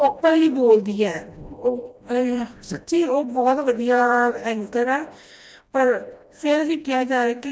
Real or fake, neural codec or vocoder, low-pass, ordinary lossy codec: fake; codec, 16 kHz, 1 kbps, FreqCodec, smaller model; none; none